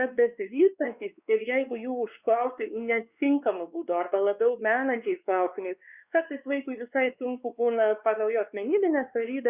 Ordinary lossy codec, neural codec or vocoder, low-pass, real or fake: Opus, 64 kbps; codec, 16 kHz, 2 kbps, X-Codec, WavLM features, trained on Multilingual LibriSpeech; 3.6 kHz; fake